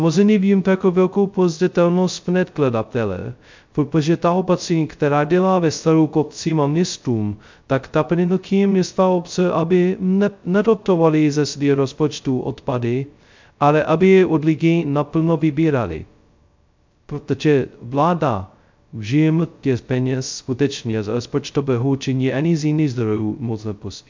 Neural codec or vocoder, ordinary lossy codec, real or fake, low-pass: codec, 16 kHz, 0.2 kbps, FocalCodec; MP3, 64 kbps; fake; 7.2 kHz